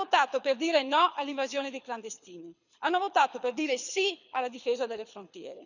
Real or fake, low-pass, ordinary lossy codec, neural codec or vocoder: fake; 7.2 kHz; none; codec, 24 kHz, 6 kbps, HILCodec